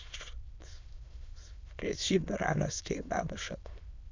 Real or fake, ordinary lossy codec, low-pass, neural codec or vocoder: fake; MP3, 64 kbps; 7.2 kHz; autoencoder, 22.05 kHz, a latent of 192 numbers a frame, VITS, trained on many speakers